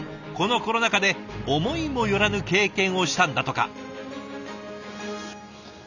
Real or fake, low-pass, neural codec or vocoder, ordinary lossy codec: real; 7.2 kHz; none; none